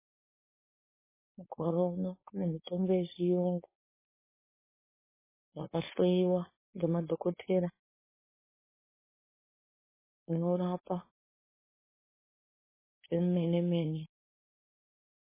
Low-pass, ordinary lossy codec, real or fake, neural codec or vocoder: 3.6 kHz; MP3, 16 kbps; fake; codec, 16 kHz, 4.8 kbps, FACodec